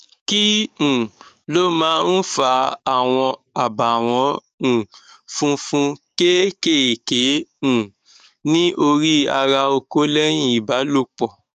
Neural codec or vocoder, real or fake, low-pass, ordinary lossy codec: codec, 44.1 kHz, 7.8 kbps, DAC; fake; 14.4 kHz; none